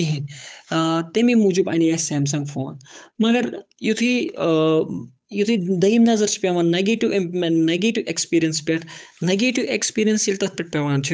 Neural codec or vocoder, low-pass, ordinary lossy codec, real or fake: codec, 16 kHz, 8 kbps, FunCodec, trained on Chinese and English, 25 frames a second; none; none; fake